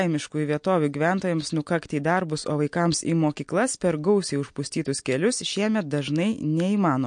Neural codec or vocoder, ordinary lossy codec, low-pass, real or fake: none; MP3, 48 kbps; 9.9 kHz; real